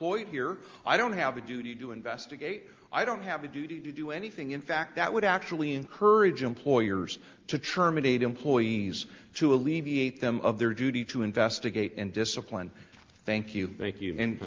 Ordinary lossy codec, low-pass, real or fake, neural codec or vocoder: Opus, 32 kbps; 7.2 kHz; real; none